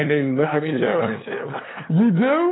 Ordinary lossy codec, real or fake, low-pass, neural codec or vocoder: AAC, 16 kbps; fake; 7.2 kHz; codec, 16 kHz, 2 kbps, FunCodec, trained on LibriTTS, 25 frames a second